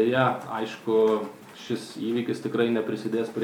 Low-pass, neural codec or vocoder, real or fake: 19.8 kHz; vocoder, 44.1 kHz, 128 mel bands every 256 samples, BigVGAN v2; fake